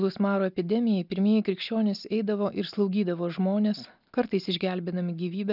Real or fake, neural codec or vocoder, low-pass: real; none; 5.4 kHz